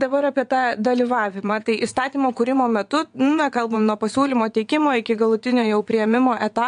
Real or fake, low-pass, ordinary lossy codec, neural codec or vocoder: fake; 9.9 kHz; MP3, 64 kbps; vocoder, 22.05 kHz, 80 mel bands, WaveNeXt